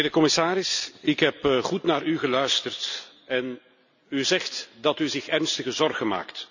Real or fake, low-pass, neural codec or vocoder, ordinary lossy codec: real; 7.2 kHz; none; none